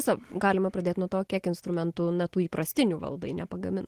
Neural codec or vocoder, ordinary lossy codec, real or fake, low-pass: none; Opus, 16 kbps; real; 14.4 kHz